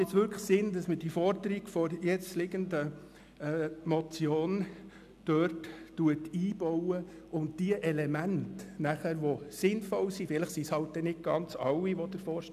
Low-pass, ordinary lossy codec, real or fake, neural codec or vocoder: 14.4 kHz; none; real; none